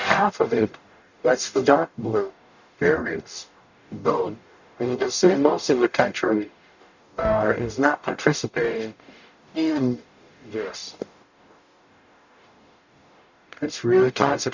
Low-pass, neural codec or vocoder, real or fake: 7.2 kHz; codec, 44.1 kHz, 0.9 kbps, DAC; fake